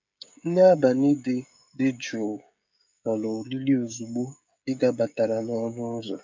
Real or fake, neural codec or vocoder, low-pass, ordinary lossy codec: fake; codec, 16 kHz, 16 kbps, FreqCodec, smaller model; 7.2 kHz; MP3, 48 kbps